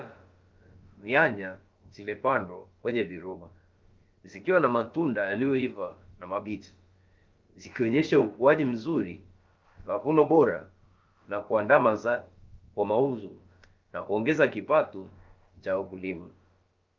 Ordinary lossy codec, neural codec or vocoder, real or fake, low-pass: Opus, 32 kbps; codec, 16 kHz, about 1 kbps, DyCAST, with the encoder's durations; fake; 7.2 kHz